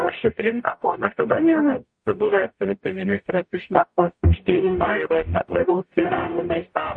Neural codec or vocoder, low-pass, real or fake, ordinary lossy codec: codec, 44.1 kHz, 0.9 kbps, DAC; 9.9 kHz; fake; MP3, 48 kbps